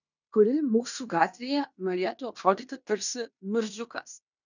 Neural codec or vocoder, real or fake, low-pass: codec, 16 kHz in and 24 kHz out, 0.9 kbps, LongCat-Audio-Codec, fine tuned four codebook decoder; fake; 7.2 kHz